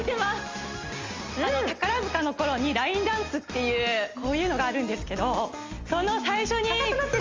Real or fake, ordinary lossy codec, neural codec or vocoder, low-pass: real; Opus, 32 kbps; none; 7.2 kHz